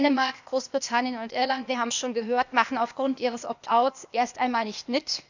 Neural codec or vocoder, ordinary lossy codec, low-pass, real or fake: codec, 16 kHz, 0.8 kbps, ZipCodec; none; 7.2 kHz; fake